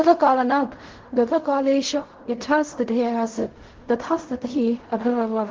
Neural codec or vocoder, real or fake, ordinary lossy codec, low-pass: codec, 16 kHz in and 24 kHz out, 0.4 kbps, LongCat-Audio-Codec, fine tuned four codebook decoder; fake; Opus, 16 kbps; 7.2 kHz